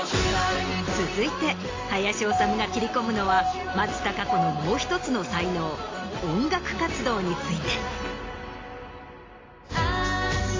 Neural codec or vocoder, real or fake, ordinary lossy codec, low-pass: none; real; AAC, 32 kbps; 7.2 kHz